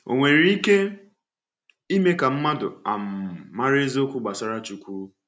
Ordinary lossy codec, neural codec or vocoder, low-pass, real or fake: none; none; none; real